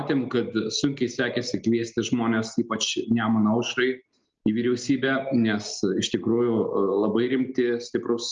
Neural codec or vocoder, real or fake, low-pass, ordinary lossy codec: none; real; 7.2 kHz; Opus, 24 kbps